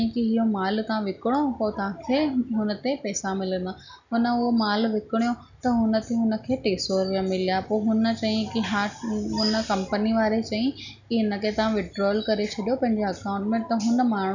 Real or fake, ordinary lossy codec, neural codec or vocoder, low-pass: real; none; none; 7.2 kHz